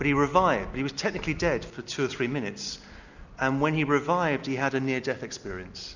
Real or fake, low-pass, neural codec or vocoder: real; 7.2 kHz; none